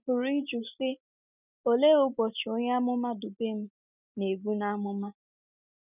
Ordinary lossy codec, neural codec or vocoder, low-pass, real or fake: none; none; 3.6 kHz; real